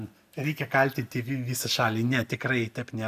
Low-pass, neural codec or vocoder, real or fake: 14.4 kHz; none; real